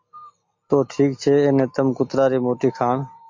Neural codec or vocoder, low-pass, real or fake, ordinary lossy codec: none; 7.2 kHz; real; MP3, 48 kbps